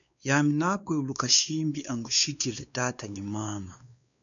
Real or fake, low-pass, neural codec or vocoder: fake; 7.2 kHz; codec, 16 kHz, 2 kbps, X-Codec, WavLM features, trained on Multilingual LibriSpeech